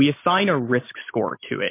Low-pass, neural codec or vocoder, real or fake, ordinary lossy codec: 3.6 kHz; none; real; MP3, 24 kbps